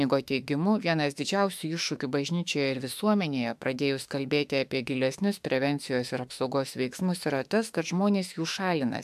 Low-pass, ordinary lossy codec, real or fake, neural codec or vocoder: 14.4 kHz; AAC, 96 kbps; fake; autoencoder, 48 kHz, 32 numbers a frame, DAC-VAE, trained on Japanese speech